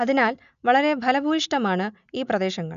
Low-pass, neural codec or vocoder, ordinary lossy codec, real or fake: 7.2 kHz; none; none; real